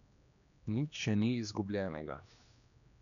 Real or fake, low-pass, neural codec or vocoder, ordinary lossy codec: fake; 7.2 kHz; codec, 16 kHz, 2 kbps, X-Codec, HuBERT features, trained on general audio; none